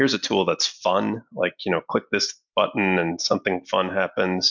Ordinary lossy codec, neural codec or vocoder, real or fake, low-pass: MP3, 64 kbps; none; real; 7.2 kHz